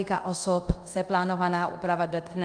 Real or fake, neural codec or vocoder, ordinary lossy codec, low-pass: fake; codec, 24 kHz, 0.5 kbps, DualCodec; Opus, 32 kbps; 9.9 kHz